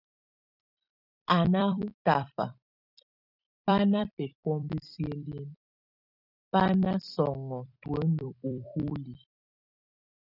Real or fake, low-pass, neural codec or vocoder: fake; 5.4 kHz; vocoder, 44.1 kHz, 128 mel bands every 256 samples, BigVGAN v2